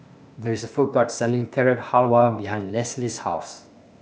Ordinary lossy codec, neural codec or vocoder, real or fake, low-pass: none; codec, 16 kHz, 0.8 kbps, ZipCodec; fake; none